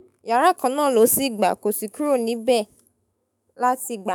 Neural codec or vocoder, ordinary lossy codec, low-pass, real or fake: autoencoder, 48 kHz, 128 numbers a frame, DAC-VAE, trained on Japanese speech; none; none; fake